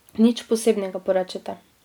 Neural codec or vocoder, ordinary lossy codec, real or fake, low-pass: none; none; real; none